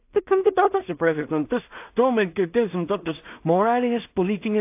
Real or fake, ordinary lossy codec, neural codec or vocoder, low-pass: fake; AAC, 32 kbps; codec, 16 kHz in and 24 kHz out, 0.4 kbps, LongCat-Audio-Codec, two codebook decoder; 3.6 kHz